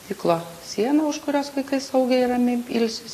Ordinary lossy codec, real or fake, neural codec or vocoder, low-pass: AAC, 48 kbps; real; none; 14.4 kHz